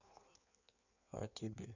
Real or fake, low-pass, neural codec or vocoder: fake; 7.2 kHz; codec, 16 kHz in and 24 kHz out, 1.1 kbps, FireRedTTS-2 codec